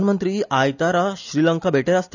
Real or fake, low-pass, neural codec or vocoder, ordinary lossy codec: real; 7.2 kHz; none; none